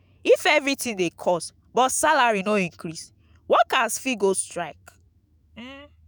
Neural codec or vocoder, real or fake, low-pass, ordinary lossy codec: autoencoder, 48 kHz, 128 numbers a frame, DAC-VAE, trained on Japanese speech; fake; none; none